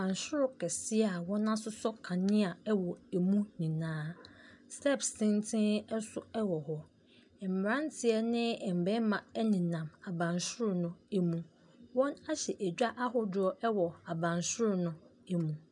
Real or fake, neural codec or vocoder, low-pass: real; none; 10.8 kHz